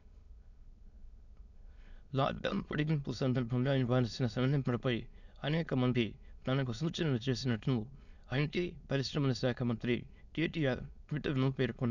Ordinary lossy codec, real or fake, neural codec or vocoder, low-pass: none; fake; autoencoder, 22.05 kHz, a latent of 192 numbers a frame, VITS, trained on many speakers; 7.2 kHz